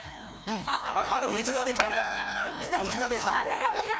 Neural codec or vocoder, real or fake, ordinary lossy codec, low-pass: codec, 16 kHz, 1 kbps, FreqCodec, larger model; fake; none; none